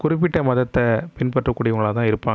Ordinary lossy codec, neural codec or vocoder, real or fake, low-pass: none; none; real; none